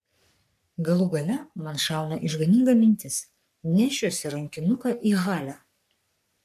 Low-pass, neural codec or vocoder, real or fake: 14.4 kHz; codec, 44.1 kHz, 3.4 kbps, Pupu-Codec; fake